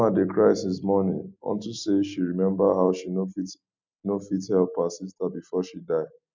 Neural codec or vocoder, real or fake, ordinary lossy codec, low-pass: none; real; MP3, 64 kbps; 7.2 kHz